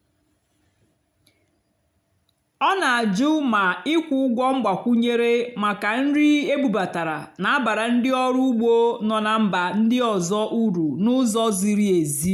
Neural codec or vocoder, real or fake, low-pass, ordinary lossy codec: none; real; 19.8 kHz; none